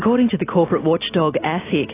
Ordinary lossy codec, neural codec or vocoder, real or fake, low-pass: AAC, 16 kbps; none; real; 3.6 kHz